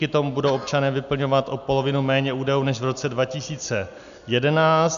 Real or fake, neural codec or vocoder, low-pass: real; none; 7.2 kHz